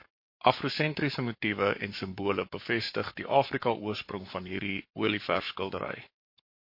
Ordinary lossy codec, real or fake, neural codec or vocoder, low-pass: MP3, 32 kbps; fake; codec, 44.1 kHz, 7.8 kbps, Pupu-Codec; 5.4 kHz